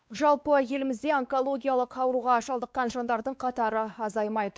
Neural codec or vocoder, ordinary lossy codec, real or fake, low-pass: codec, 16 kHz, 2 kbps, X-Codec, WavLM features, trained on Multilingual LibriSpeech; none; fake; none